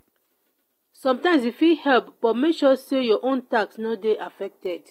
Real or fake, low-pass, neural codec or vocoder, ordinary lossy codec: real; 19.8 kHz; none; AAC, 48 kbps